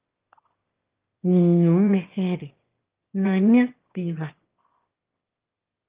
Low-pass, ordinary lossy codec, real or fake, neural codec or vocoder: 3.6 kHz; Opus, 32 kbps; fake; autoencoder, 22.05 kHz, a latent of 192 numbers a frame, VITS, trained on one speaker